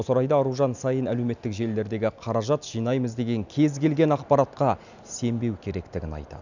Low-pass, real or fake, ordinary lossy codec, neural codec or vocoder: 7.2 kHz; real; none; none